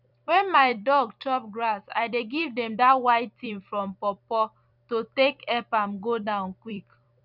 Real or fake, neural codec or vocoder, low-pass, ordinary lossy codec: fake; vocoder, 44.1 kHz, 128 mel bands every 256 samples, BigVGAN v2; 5.4 kHz; none